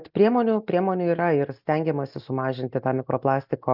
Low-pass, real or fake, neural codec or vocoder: 5.4 kHz; real; none